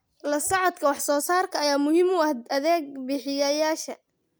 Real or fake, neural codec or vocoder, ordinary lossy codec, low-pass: real; none; none; none